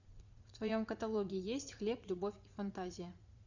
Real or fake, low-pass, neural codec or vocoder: fake; 7.2 kHz; vocoder, 44.1 kHz, 80 mel bands, Vocos